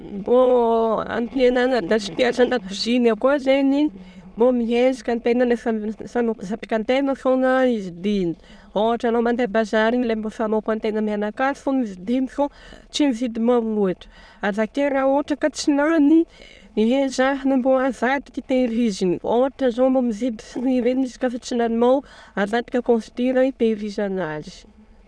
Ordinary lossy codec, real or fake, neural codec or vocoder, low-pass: none; fake; autoencoder, 22.05 kHz, a latent of 192 numbers a frame, VITS, trained on many speakers; none